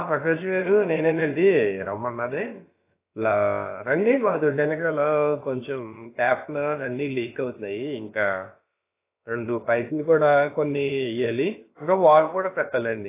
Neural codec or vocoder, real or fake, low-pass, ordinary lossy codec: codec, 16 kHz, about 1 kbps, DyCAST, with the encoder's durations; fake; 3.6 kHz; AAC, 24 kbps